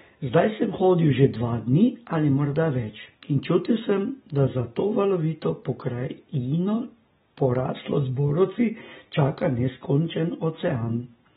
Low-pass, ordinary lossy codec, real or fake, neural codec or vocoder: 10.8 kHz; AAC, 16 kbps; real; none